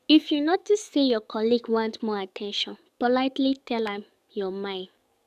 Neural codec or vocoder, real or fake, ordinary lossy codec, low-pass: codec, 44.1 kHz, 7.8 kbps, DAC; fake; none; 14.4 kHz